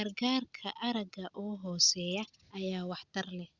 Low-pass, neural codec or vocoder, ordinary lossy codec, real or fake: 7.2 kHz; none; Opus, 64 kbps; real